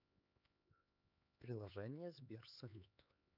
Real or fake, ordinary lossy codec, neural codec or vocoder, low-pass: fake; none; codec, 16 kHz, 4 kbps, X-Codec, HuBERT features, trained on LibriSpeech; 5.4 kHz